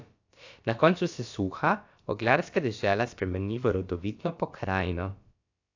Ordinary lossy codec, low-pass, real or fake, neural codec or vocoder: AAC, 48 kbps; 7.2 kHz; fake; codec, 16 kHz, about 1 kbps, DyCAST, with the encoder's durations